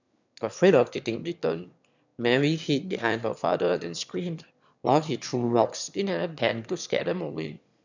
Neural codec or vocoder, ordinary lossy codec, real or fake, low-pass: autoencoder, 22.05 kHz, a latent of 192 numbers a frame, VITS, trained on one speaker; none; fake; 7.2 kHz